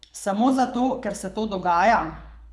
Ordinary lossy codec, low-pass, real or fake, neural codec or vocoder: none; none; fake; codec, 24 kHz, 6 kbps, HILCodec